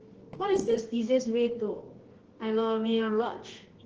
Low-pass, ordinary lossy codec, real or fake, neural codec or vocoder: 7.2 kHz; Opus, 16 kbps; fake; codec, 24 kHz, 0.9 kbps, WavTokenizer, medium music audio release